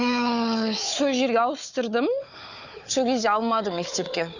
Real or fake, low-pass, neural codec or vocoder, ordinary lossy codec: fake; 7.2 kHz; codec, 16 kHz, 4 kbps, FunCodec, trained on Chinese and English, 50 frames a second; none